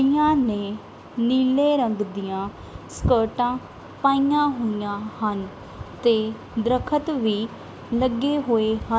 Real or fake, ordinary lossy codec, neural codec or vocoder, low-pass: real; none; none; none